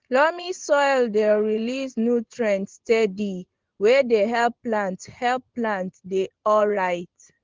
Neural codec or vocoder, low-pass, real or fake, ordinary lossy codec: vocoder, 24 kHz, 100 mel bands, Vocos; 7.2 kHz; fake; Opus, 16 kbps